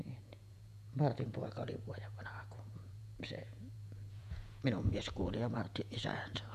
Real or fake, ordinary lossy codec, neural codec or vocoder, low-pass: fake; none; vocoder, 48 kHz, 128 mel bands, Vocos; 14.4 kHz